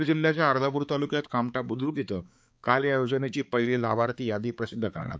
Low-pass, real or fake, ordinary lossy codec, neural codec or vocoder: none; fake; none; codec, 16 kHz, 4 kbps, X-Codec, HuBERT features, trained on balanced general audio